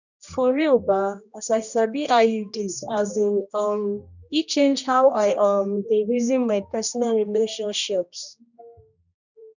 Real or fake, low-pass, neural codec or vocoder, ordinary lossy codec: fake; 7.2 kHz; codec, 16 kHz, 1 kbps, X-Codec, HuBERT features, trained on general audio; none